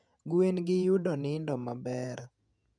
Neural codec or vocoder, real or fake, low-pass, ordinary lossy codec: vocoder, 48 kHz, 128 mel bands, Vocos; fake; 9.9 kHz; none